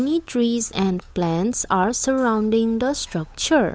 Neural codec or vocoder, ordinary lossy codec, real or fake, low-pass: codec, 16 kHz, 8 kbps, FunCodec, trained on Chinese and English, 25 frames a second; none; fake; none